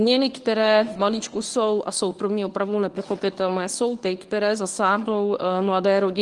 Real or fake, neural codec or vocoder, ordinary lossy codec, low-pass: fake; codec, 24 kHz, 0.9 kbps, WavTokenizer, medium speech release version 1; Opus, 24 kbps; 10.8 kHz